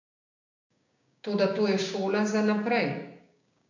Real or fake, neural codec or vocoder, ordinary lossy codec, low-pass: fake; codec, 16 kHz, 6 kbps, DAC; AAC, 48 kbps; 7.2 kHz